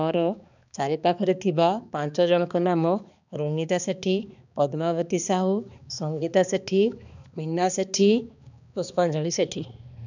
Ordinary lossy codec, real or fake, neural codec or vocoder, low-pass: none; fake; codec, 16 kHz, 2 kbps, X-Codec, HuBERT features, trained on balanced general audio; 7.2 kHz